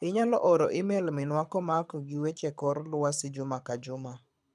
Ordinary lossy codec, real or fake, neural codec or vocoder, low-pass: none; fake; codec, 24 kHz, 6 kbps, HILCodec; none